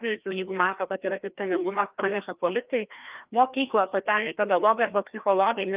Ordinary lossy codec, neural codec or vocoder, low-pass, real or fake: Opus, 24 kbps; codec, 16 kHz, 1 kbps, FreqCodec, larger model; 3.6 kHz; fake